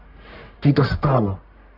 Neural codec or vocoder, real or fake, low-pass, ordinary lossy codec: codec, 44.1 kHz, 1.7 kbps, Pupu-Codec; fake; 5.4 kHz; AAC, 48 kbps